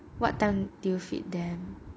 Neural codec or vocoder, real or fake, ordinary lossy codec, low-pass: none; real; none; none